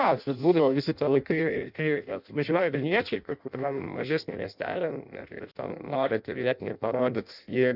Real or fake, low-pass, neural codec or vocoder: fake; 5.4 kHz; codec, 16 kHz in and 24 kHz out, 0.6 kbps, FireRedTTS-2 codec